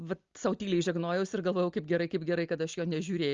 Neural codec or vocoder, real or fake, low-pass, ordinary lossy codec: none; real; 7.2 kHz; Opus, 32 kbps